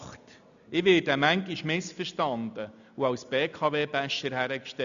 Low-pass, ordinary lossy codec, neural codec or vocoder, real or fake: 7.2 kHz; MP3, 96 kbps; none; real